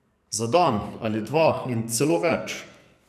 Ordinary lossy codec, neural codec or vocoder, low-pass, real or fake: none; codec, 44.1 kHz, 2.6 kbps, SNAC; 14.4 kHz; fake